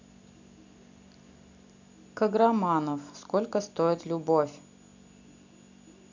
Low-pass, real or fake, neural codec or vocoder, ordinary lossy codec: 7.2 kHz; real; none; none